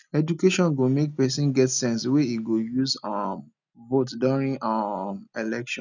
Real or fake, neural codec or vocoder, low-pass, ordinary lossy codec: real; none; 7.2 kHz; none